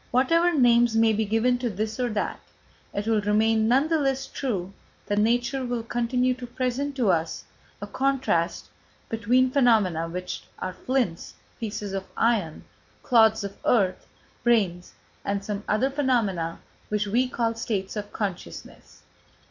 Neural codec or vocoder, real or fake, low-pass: none; real; 7.2 kHz